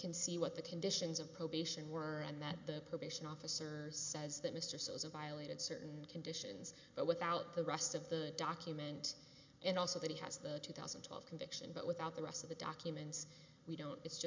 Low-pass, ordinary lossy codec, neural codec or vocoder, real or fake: 7.2 kHz; MP3, 64 kbps; none; real